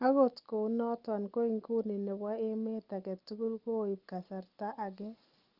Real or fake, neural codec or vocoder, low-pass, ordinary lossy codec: real; none; 5.4 kHz; Opus, 32 kbps